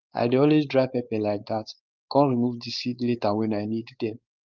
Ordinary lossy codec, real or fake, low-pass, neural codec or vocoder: Opus, 24 kbps; fake; 7.2 kHz; codec, 16 kHz, 4.8 kbps, FACodec